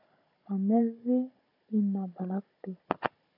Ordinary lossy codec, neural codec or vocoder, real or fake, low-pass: AAC, 32 kbps; codec, 16 kHz, 16 kbps, FunCodec, trained on Chinese and English, 50 frames a second; fake; 5.4 kHz